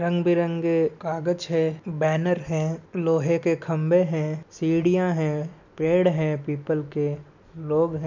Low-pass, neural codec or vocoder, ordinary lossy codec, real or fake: 7.2 kHz; none; none; real